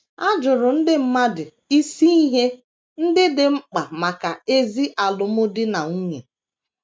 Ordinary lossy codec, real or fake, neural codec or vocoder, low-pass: none; real; none; none